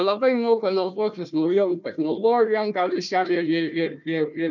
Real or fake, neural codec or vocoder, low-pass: fake; codec, 16 kHz, 1 kbps, FunCodec, trained on Chinese and English, 50 frames a second; 7.2 kHz